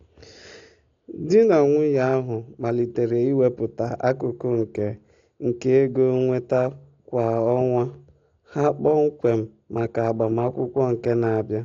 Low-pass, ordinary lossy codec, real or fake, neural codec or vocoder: 7.2 kHz; AAC, 48 kbps; real; none